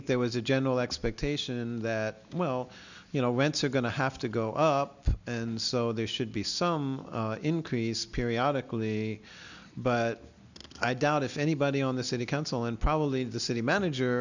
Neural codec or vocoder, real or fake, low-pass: none; real; 7.2 kHz